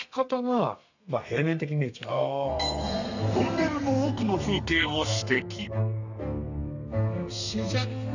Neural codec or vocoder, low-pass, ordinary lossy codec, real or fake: codec, 32 kHz, 1.9 kbps, SNAC; 7.2 kHz; none; fake